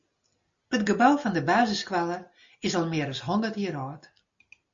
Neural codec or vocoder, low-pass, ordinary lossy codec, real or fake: none; 7.2 kHz; MP3, 48 kbps; real